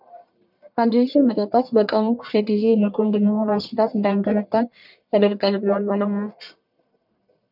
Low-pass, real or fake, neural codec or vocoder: 5.4 kHz; fake; codec, 44.1 kHz, 1.7 kbps, Pupu-Codec